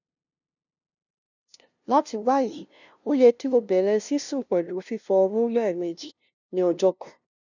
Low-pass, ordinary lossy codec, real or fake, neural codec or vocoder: 7.2 kHz; none; fake; codec, 16 kHz, 0.5 kbps, FunCodec, trained on LibriTTS, 25 frames a second